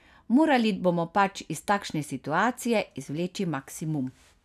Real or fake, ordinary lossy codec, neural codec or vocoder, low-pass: real; none; none; 14.4 kHz